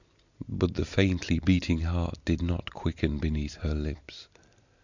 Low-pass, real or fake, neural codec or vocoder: 7.2 kHz; real; none